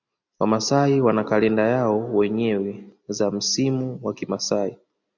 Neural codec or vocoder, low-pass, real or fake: none; 7.2 kHz; real